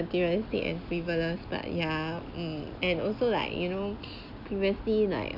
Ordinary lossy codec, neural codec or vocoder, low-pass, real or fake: none; none; 5.4 kHz; real